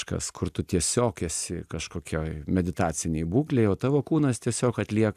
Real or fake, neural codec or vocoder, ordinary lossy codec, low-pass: real; none; AAC, 96 kbps; 14.4 kHz